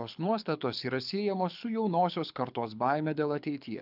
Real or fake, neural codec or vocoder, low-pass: fake; codec, 24 kHz, 6 kbps, HILCodec; 5.4 kHz